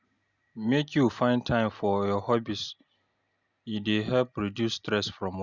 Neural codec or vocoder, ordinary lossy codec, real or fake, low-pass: none; Opus, 64 kbps; real; 7.2 kHz